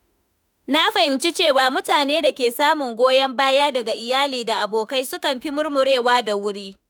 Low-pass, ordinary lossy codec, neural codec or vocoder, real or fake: none; none; autoencoder, 48 kHz, 32 numbers a frame, DAC-VAE, trained on Japanese speech; fake